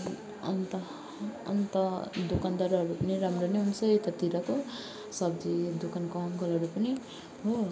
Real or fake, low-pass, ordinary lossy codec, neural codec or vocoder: real; none; none; none